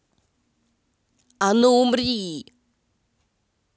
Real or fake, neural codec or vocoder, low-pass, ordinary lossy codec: real; none; none; none